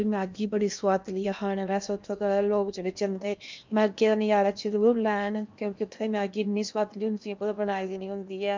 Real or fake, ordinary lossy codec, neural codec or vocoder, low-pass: fake; MP3, 64 kbps; codec, 16 kHz in and 24 kHz out, 0.8 kbps, FocalCodec, streaming, 65536 codes; 7.2 kHz